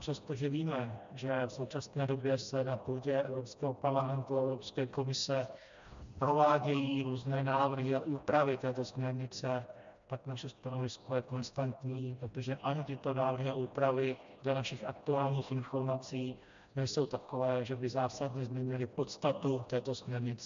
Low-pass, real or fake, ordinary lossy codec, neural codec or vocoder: 7.2 kHz; fake; MP3, 64 kbps; codec, 16 kHz, 1 kbps, FreqCodec, smaller model